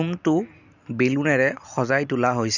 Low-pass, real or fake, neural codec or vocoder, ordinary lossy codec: 7.2 kHz; real; none; none